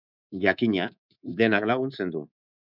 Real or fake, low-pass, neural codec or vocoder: fake; 5.4 kHz; codec, 24 kHz, 3.1 kbps, DualCodec